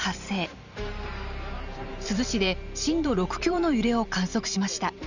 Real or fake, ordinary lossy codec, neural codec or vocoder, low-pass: fake; none; vocoder, 44.1 kHz, 128 mel bands every 512 samples, BigVGAN v2; 7.2 kHz